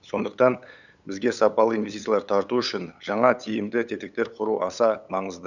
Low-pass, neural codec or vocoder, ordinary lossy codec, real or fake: 7.2 kHz; codec, 16 kHz, 8 kbps, FunCodec, trained on LibriTTS, 25 frames a second; none; fake